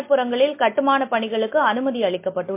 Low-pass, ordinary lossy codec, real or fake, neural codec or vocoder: 3.6 kHz; MP3, 24 kbps; real; none